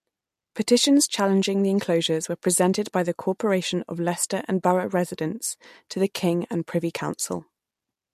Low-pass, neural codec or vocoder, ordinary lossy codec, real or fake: 14.4 kHz; none; MP3, 64 kbps; real